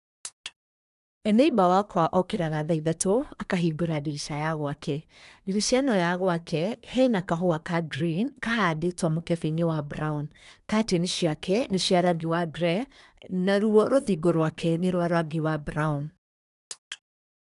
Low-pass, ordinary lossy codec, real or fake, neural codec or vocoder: 10.8 kHz; none; fake; codec, 24 kHz, 1 kbps, SNAC